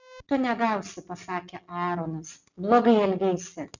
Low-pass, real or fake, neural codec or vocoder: 7.2 kHz; real; none